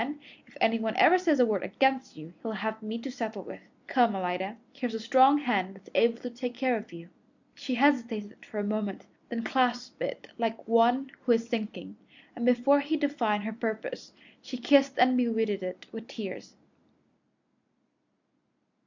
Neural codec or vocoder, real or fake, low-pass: none; real; 7.2 kHz